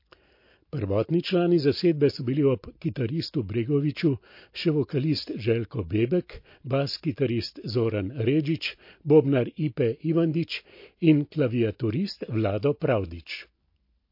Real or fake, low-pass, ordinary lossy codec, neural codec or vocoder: real; 5.4 kHz; MP3, 32 kbps; none